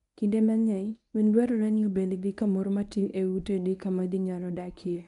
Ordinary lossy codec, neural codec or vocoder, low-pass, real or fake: none; codec, 24 kHz, 0.9 kbps, WavTokenizer, medium speech release version 1; 10.8 kHz; fake